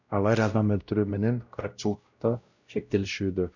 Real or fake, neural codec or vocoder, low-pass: fake; codec, 16 kHz, 0.5 kbps, X-Codec, WavLM features, trained on Multilingual LibriSpeech; 7.2 kHz